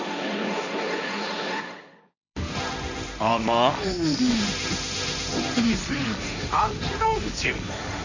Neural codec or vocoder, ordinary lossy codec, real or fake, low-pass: codec, 16 kHz, 1.1 kbps, Voila-Tokenizer; none; fake; 7.2 kHz